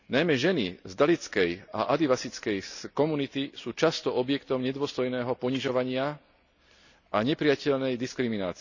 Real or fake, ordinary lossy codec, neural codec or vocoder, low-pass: real; none; none; 7.2 kHz